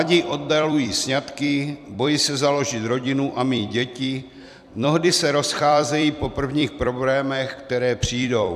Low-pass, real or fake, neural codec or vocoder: 14.4 kHz; fake; vocoder, 44.1 kHz, 128 mel bands every 256 samples, BigVGAN v2